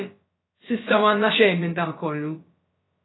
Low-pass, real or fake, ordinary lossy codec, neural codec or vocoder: 7.2 kHz; fake; AAC, 16 kbps; codec, 16 kHz, about 1 kbps, DyCAST, with the encoder's durations